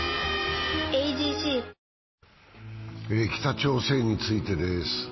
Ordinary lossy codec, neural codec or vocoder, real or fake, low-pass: MP3, 24 kbps; none; real; 7.2 kHz